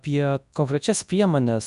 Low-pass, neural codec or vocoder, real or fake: 10.8 kHz; codec, 24 kHz, 0.9 kbps, WavTokenizer, large speech release; fake